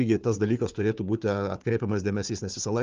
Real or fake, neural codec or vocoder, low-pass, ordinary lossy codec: fake; codec, 16 kHz, 4 kbps, FunCodec, trained on Chinese and English, 50 frames a second; 7.2 kHz; Opus, 32 kbps